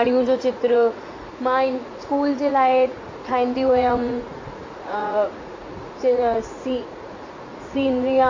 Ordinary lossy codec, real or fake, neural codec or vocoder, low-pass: MP3, 32 kbps; fake; vocoder, 44.1 kHz, 80 mel bands, Vocos; 7.2 kHz